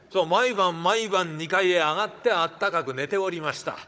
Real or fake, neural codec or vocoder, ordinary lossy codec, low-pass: fake; codec, 16 kHz, 16 kbps, FunCodec, trained on Chinese and English, 50 frames a second; none; none